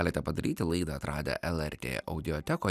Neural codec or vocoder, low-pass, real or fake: none; 14.4 kHz; real